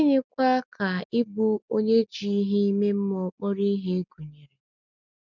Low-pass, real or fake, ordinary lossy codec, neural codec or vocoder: 7.2 kHz; real; none; none